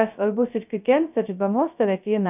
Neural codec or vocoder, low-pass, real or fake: codec, 16 kHz, 0.2 kbps, FocalCodec; 3.6 kHz; fake